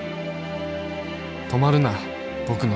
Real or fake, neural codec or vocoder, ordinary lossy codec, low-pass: real; none; none; none